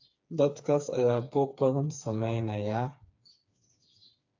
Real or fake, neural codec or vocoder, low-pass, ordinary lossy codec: fake; codec, 16 kHz, 4 kbps, FreqCodec, smaller model; 7.2 kHz; AAC, 48 kbps